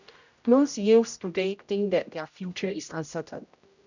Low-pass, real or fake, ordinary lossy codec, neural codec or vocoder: 7.2 kHz; fake; none; codec, 16 kHz, 0.5 kbps, X-Codec, HuBERT features, trained on general audio